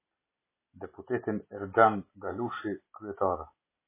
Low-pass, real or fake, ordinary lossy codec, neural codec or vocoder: 3.6 kHz; real; MP3, 16 kbps; none